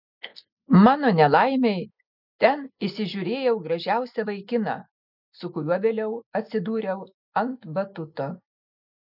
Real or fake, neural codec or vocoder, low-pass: real; none; 5.4 kHz